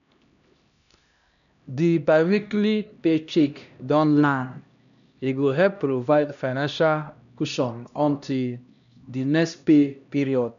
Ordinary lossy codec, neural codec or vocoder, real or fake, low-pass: none; codec, 16 kHz, 1 kbps, X-Codec, HuBERT features, trained on LibriSpeech; fake; 7.2 kHz